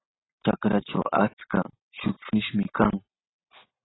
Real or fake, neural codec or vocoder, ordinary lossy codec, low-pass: real; none; AAC, 16 kbps; 7.2 kHz